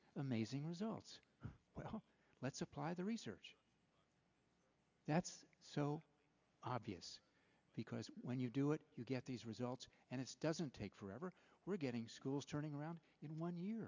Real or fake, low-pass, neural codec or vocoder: real; 7.2 kHz; none